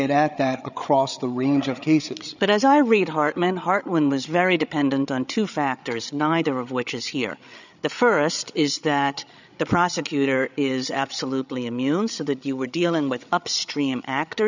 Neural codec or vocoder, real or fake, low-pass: codec, 16 kHz, 8 kbps, FreqCodec, larger model; fake; 7.2 kHz